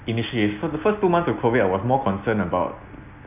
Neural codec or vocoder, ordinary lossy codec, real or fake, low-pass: none; none; real; 3.6 kHz